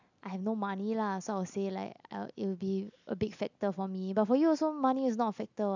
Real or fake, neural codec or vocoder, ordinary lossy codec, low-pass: real; none; none; 7.2 kHz